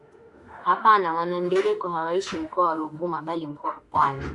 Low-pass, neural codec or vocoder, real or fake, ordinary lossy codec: 10.8 kHz; autoencoder, 48 kHz, 32 numbers a frame, DAC-VAE, trained on Japanese speech; fake; Opus, 64 kbps